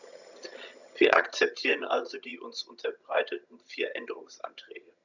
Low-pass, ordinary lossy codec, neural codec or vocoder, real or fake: 7.2 kHz; none; vocoder, 22.05 kHz, 80 mel bands, HiFi-GAN; fake